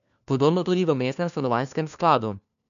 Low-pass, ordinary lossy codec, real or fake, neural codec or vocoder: 7.2 kHz; none; fake; codec, 16 kHz, 1 kbps, FunCodec, trained on LibriTTS, 50 frames a second